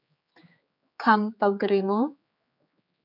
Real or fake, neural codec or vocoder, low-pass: fake; codec, 16 kHz, 4 kbps, X-Codec, HuBERT features, trained on general audio; 5.4 kHz